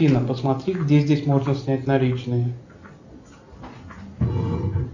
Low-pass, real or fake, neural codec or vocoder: 7.2 kHz; real; none